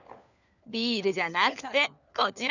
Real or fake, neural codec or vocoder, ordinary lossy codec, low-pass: fake; codec, 16 kHz, 4 kbps, FunCodec, trained on LibriTTS, 50 frames a second; none; 7.2 kHz